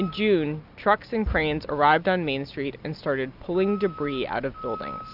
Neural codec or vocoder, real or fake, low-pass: none; real; 5.4 kHz